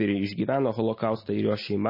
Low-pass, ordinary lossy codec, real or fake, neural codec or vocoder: 5.4 kHz; MP3, 24 kbps; real; none